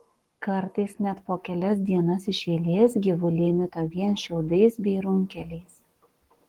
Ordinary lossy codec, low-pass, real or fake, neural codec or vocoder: Opus, 24 kbps; 19.8 kHz; fake; codec, 44.1 kHz, 7.8 kbps, Pupu-Codec